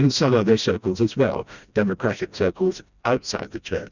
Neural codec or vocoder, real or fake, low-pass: codec, 16 kHz, 1 kbps, FreqCodec, smaller model; fake; 7.2 kHz